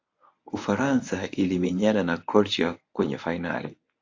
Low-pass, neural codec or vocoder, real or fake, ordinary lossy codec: 7.2 kHz; codec, 24 kHz, 0.9 kbps, WavTokenizer, medium speech release version 1; fake; AAC, 48 kbps